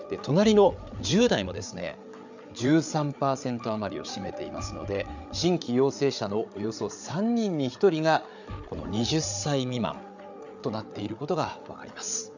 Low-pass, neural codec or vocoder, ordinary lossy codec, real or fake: 7.2 kHz; codec, 16 kHz, 8 kbps, FreqCodec, larger model; none; fake